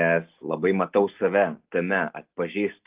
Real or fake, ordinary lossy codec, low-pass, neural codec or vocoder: real; Opus, 32 kbps; 3.6 kHz; none